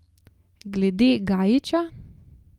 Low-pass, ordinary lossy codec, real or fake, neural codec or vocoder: 19.8 kHz; Opus, 32 kbps; real; none